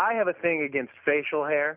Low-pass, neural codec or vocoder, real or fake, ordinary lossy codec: 3.6 kHz; none; real; Opus, 64 kbps